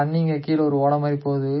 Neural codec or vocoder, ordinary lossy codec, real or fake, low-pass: none; MP3, 24 kbps; real; 7.2 kHz